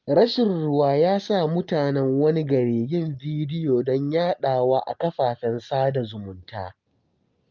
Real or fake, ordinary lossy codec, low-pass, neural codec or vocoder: real; Opus, 24 kbps; 7.2 kHz; none